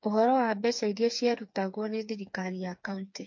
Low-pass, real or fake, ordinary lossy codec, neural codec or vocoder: 7.2 kHz; fake; MP3, 48 kbps; codec, 16 kHz, 4 kbps, FreqCodec, smaller model